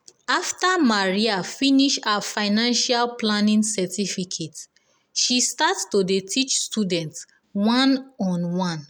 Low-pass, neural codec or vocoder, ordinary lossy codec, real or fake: 19.8 kHz; none; none; real